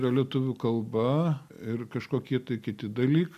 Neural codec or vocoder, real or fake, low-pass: none; real; 14.4 kHz